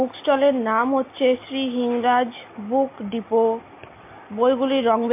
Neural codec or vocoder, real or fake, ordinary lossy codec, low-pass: none; real; MP3, 24 kbps; 3.6 kHz